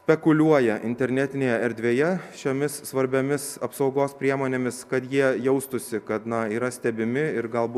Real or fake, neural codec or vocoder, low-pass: real; none; 14.4 kHz